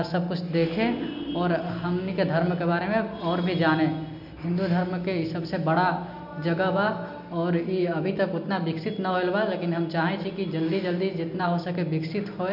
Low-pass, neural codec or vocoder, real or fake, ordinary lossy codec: 5.4 kHz; none; real; none